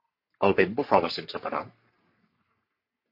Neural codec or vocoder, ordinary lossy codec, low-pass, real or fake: codec, 44.1 kHz, 3.4 kbps, Pupu-Codec; MP3, 32 kbps; 5.4 kHz; fake